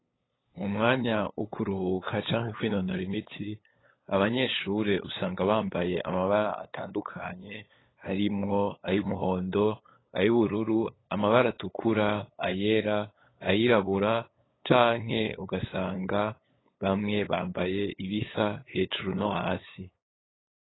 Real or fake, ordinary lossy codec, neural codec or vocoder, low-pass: fake; AAC, 16 kbps; codec, 16 kHz, 8 kbps, FunCodec, trained on LibriTTS, 25 frames a second; 7.2 kHz